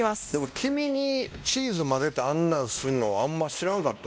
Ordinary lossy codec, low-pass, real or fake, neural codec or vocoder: none; none; fake; codec, 16 kHz, 1 kbps, X-Codec, WavLM features, trained on Multilingual LibriSpeech